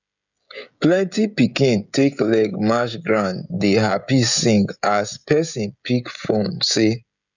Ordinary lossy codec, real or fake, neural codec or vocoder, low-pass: none; fake; codec, 16 kHz, 16 kbps, FreqCodec, smaller model; 7.2 kHz